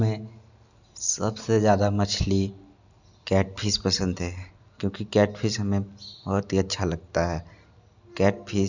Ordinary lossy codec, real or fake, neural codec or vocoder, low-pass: none; real; none; 7.2 kHz